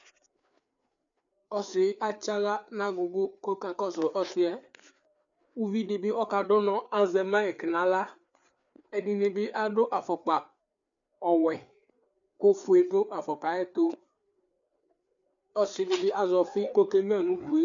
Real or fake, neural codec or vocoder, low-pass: fake; codec, 16 kHz, 4 kbps, FreqCodec, larger model; 7.2 kHz